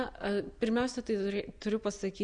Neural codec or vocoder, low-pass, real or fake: none; 9.9 kHz; real